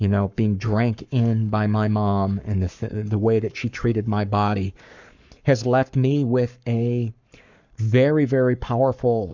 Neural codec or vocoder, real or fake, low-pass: codec, 44.1 kHz, 7.8 kbps, Pupu-Codec; fake; 7.2 kHz